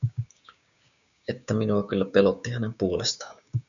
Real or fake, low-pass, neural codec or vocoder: fake; 7.2 kHz; codec, 16 kHz, 6 kbps, DAC